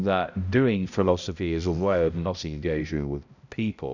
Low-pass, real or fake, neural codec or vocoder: 7.2 kHz; fake; codec, 16 kHz, 0.5 kbps, X-Codec, HuBERT features, trained on balanced general audio